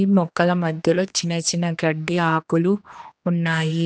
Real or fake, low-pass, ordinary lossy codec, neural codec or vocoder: fake; none; none; codec, 16 kHz, 2 kbps, X-Codec, HuBERT features, trained on general audio